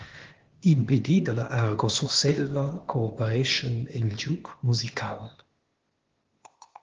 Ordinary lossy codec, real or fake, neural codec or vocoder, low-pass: Opus, 16 kbps; fake; codec, 16 kHz, 0.8 kbps, ZipCodec; 7.2 kHz